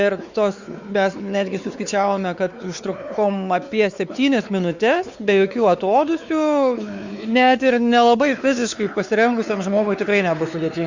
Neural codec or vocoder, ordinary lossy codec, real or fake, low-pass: codec, 16 kHz, 4 kbps, X-Codec, WavLM features, trained on Multilingual LibriSpeech; Opus, 64 kbps; fake; 7.2 kHz